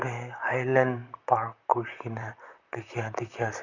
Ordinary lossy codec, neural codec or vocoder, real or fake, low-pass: none; vocoder, 44.1 kHz, 128 mel bands, Pupu-Vocoder; fake; 7.2 kHz